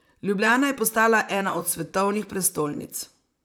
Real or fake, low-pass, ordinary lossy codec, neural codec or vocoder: fake; none; none; vocoder, 44.1 kHz, 128 mel bands, Pupu-Vocoder